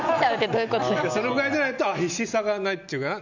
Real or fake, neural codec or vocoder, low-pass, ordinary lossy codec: real; none; 7.2 kHz; none